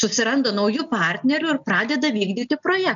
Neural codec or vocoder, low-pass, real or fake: none; 7.2 kHz; real